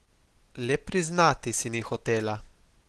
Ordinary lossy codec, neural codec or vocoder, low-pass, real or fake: Opus, 16 kbps; none; 10.8 kHz; real